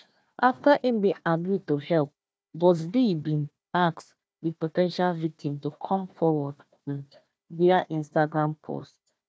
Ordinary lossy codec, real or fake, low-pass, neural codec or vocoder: none; fake; none; codec, 16 kHz, 1 kbps, FunCodec, trained on Chinese and English, 50 frames a second